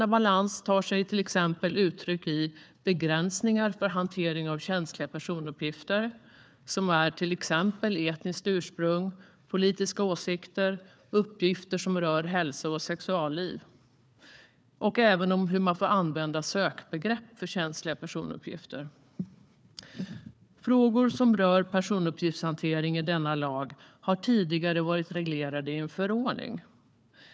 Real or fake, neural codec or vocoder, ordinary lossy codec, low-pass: fake; codec, 16 kHz, 4 kbps, FunCodec, trained on Chinese and English, 50 frames a second; none; none